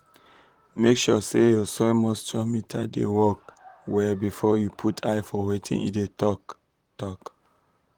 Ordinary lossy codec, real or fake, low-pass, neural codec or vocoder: Opus, 24 kbps; fake; 19.8 kHz; vocoder, 44.1 kHz, 128 mel bands, Pupu-Vocoder